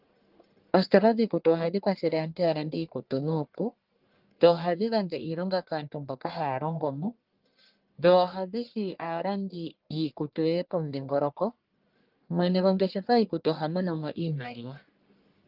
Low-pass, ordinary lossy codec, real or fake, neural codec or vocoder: 5.4 kHz; Opus, 32 kbps; fake; codec, 44.1 kHz, 1.7 kbps, Pupu-Codec